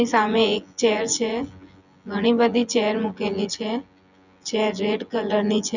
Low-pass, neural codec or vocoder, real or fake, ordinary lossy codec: 7.2 kHz; vocoder, 24 kHz, 100 mel bands, Vocos; fake; none